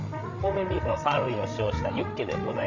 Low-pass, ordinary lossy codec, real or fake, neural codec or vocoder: 7.2 kHz; none; fake; codec, 16 kHz, 16 kbps, FreqCodec, larger model